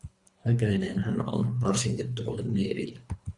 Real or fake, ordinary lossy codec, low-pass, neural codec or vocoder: fake; Opus, 64 kbps; 10.8 kHz; codec, 24 kHz, 3 kbps, HILCodec